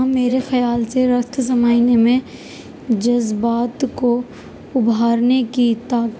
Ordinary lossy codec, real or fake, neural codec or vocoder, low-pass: none; real; none; none